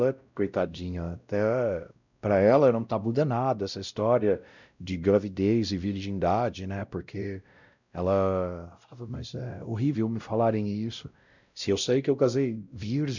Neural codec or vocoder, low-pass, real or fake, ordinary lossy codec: codec, 16 kHz, 0.5 kbps, X-Codec, WavLM features, trained on Multilingual LibriSpeech; 7.2 kHz; fake; none